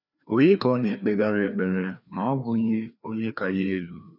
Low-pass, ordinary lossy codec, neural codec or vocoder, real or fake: 5.4 kHz; none; codec, 16 kHz, 2 kbps, FreqCodec, larger model; fake